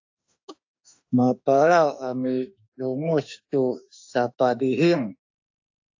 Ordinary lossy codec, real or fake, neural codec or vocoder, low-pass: AAC, 48 kbps; fake; autoencoder, 48 kHz, 32 numbers a frame, DAC-VAE, trained on Japanese speech; 7.2 kHz